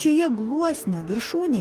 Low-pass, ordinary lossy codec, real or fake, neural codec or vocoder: 14.4 kHz; Opus, 32 kbps; fake; codec, 44.1 kHz, 2.6 kbps, DAC